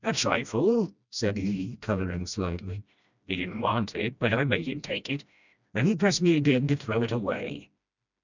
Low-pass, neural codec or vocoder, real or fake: 7.2 kHz; codec, 16 kHz, 1 kbps, FreqCodec, smaller model; fake